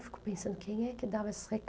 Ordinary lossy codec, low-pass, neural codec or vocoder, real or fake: none; none; none; real